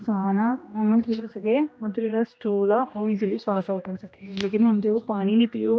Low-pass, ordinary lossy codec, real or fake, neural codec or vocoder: none; none; fake; codec, 16 kHz, 1 kbps, X-Codec, HuBERT features, trained on general audio